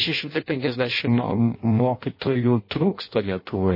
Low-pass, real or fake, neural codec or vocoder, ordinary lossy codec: 5.4 kHz; fake; codec, 16 kHz in and 24 kHz out, 0.6 kbps, FireRedTTS-2 codec; MP3, 24 kbps